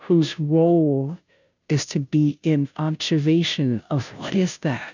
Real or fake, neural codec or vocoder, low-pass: fake; codec, 16 kHz, 0.5 kbps, FunCodec, trained on Chinese and English, 25 frames a second; 7.2 kHz